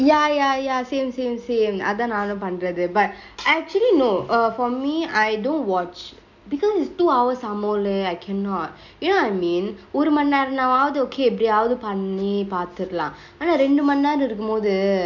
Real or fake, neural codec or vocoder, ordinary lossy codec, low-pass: real; none; none; 7.2 kHz